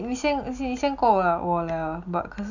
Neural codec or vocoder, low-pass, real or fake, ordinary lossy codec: none; 7.2 kHz; real; none